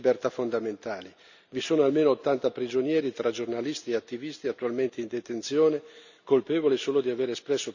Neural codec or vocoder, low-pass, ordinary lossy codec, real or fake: none; 7.2 kHz; none; real